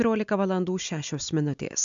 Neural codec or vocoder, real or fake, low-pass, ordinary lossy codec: none; real; 7.2 kHz; MP3, 64 kbps